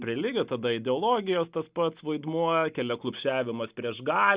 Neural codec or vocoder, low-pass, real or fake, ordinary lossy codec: none; 3.6 kHz; real; Opus, 64 kbps